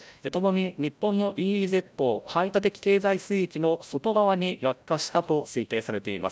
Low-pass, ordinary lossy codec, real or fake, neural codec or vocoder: none; none; fake; codec, 16 kHz, 0.5 kbps, FreqCodec, larger model